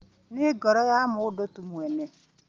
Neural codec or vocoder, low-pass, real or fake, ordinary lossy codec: none; 7.2 kHz; real; Opus, 32 kbps